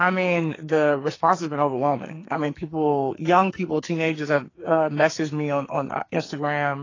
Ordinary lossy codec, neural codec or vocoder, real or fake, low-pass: AAC, 32 kbps; codec, 44.1 kHz, 2.6 kbps, SNAC; fake; 7.2 kHz